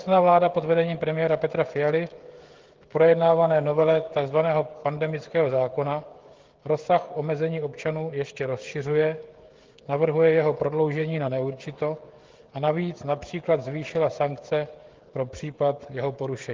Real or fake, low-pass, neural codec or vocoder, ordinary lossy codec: fake; 7.2 kHz; codec, 16 kHz, 16 kbps, FreqCodec, smaller model; Opus, 16 kbps